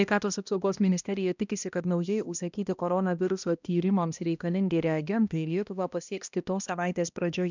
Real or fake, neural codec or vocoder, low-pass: fake; codec, 16 kHz, 1 kbps, X-Codec, HuBERT features, trained on balanced general audio; 7.2 kHz